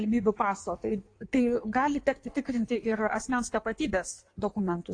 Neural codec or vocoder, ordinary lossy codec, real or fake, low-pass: codec, 16 kHz in and 24 kHz out, 1.1 kbps, FireRedTTS-2 codec; AAC, 48 kbps; fake; 9.9 kHz